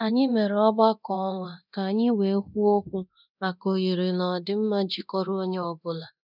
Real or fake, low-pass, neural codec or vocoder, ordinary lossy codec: fake; 5.4 kHz; codec, 24 kHz, 0.9 kbps, DualCodec; none